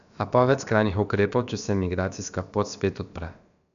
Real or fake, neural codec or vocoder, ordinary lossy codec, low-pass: fake; codec, 16 kHz, about 1 kbps, DyCAST, with the encoder's durations; none; 7.2 kHz